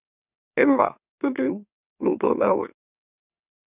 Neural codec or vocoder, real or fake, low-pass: autoencoder, 44.1 kHz, a latent of 192 numbers a frame, MeloTTS; fake; 3.6 kHz